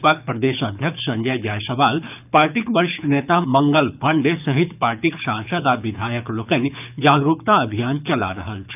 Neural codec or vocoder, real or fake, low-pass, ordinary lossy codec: codec, 24 kHz, 6 kbps, HILCodec; fake; 3.6 kHz; none